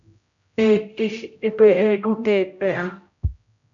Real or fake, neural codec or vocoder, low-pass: fake; codec, 16 kHz, 0.5 kbps, X-Codec, HuBERT features, trained on general audio; 7.2 kHz